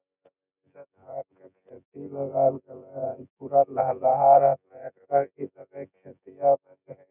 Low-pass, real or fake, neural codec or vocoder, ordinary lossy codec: 3.6 kHz; fake; vocoder, 24 kHz, 100 mel bands, Vocos; none